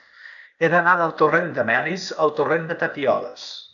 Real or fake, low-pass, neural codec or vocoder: fake; 7.2 kHz; codec, 16 kHz, 0.8 kbps, ZipCodec